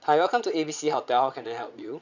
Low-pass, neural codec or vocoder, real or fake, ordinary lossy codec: 7.2 kHz; vocoder, 22.05 kHz, 80 mel bands, Vocos; fake; none